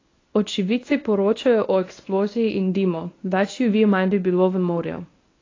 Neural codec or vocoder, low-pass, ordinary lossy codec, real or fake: codec, 24 kHz, 0.9 kbps, WavTokenizer, medium speech release version 1; 7.2 kHz; AAC, 32 kbps; fake